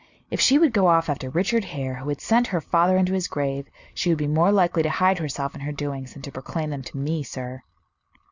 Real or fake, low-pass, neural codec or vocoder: real; 7.2 kHz; none